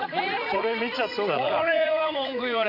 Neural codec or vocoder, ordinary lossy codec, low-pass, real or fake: vocoder, 44.1 kHz, 80 mel bands, Vocos; none; 5.4 kHz; fake